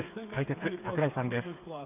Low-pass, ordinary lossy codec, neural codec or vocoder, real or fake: 3.6 kHz; none; codec, 16 kHz, 8 kbps, FreqCodec, smaller model; fake